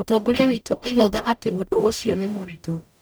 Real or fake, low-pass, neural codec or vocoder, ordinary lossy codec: fake; none; codec, 44.1 kHz, 0.9 kbps, DAC; none